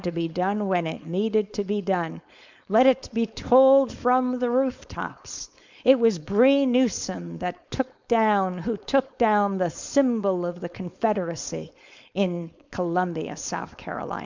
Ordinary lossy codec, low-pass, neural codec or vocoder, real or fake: MP3, 64 kbps; 7.2 kHz; codec, 16 kHz, 4.8 kbps, FACodec; fake